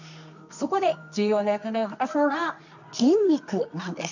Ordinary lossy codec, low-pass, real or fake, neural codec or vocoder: none; 7.2 kHz; fake; codec, 24 kHz, 0.9 kbps, WavTokenizer, medium music audio release